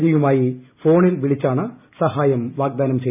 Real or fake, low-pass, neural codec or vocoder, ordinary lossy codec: real; 3.6 kHz; none; none